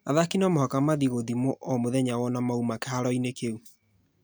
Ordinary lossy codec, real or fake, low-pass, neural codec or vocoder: none; real; none; none